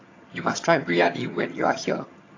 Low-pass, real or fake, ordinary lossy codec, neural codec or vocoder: 7.2 kHz; fake; AAC, 32 kbps; vocoder, 22.05 kHz, 80 mel bands, HiFi-GAN